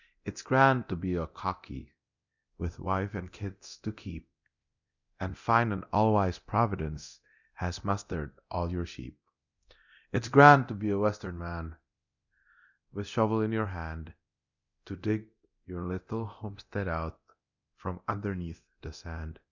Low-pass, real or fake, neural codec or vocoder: 7.2 kHz; fake; codec, 24 kHz, 0.9 kbps, DualCodec